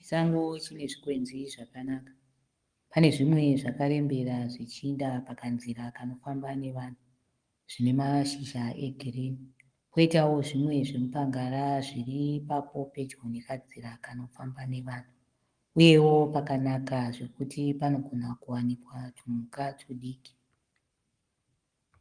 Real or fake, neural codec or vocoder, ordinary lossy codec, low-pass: fake; codec, 44.1 kHz, 7.8 kbps, Pupu-Codec; Opus, 32 kbps; 9.9 kHz